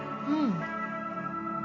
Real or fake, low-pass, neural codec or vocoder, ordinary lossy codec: real; 7.2 kHz; none; none